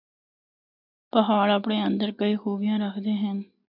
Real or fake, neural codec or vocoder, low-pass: real; none; 5.4 kHz